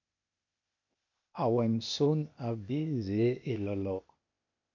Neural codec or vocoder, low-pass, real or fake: codec, 16 kHz, 0.8 kbps, ZipCodec; 7.2 kHz; fake